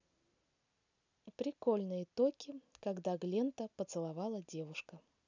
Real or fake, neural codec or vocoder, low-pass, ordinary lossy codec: real; none; 7.2 kHz; none